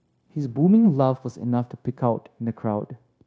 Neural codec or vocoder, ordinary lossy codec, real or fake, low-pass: codec, 16 kHz, 0.9 kbps, LongCat-Audio-Codec; none; fake; none